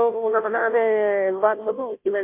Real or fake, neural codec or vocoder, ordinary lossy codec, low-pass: fake; codec, 16 kHz, 0.5 kbps, FunCodec, trained on Chinese and English, 25 frames a second; MP3, 32 kbps; 3.6 kHz